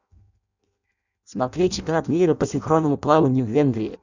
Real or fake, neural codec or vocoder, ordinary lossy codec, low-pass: fake; codec, 16 kHz in and 24 kHz out, 0.6 kbps, FireRedTTS-2 codec; none; 7.2 kHz